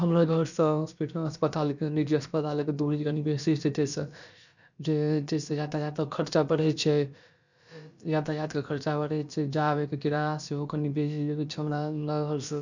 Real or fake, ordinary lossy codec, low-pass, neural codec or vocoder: fake; none; 7.2 kHz; codec, 16 kHz, about 1 kbps, DyCAST, with the encoder's durations